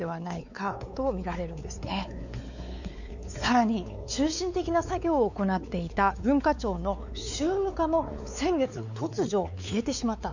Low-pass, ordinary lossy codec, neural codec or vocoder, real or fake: 7.2 kHz; none; codec, 16 kHz, 4 kbps, X-Codec, WavLM features, trained on Multilingual LibriSpeech; fake